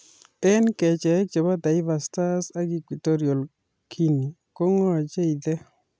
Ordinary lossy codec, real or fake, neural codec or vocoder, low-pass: none; real; none; none